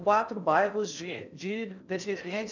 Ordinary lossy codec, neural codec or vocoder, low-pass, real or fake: none; codec, 16 kHz in and 24 kHz out, 0.8 kbps, FocalCodec, streaming, 65536 codes; 7.2 kHz; fake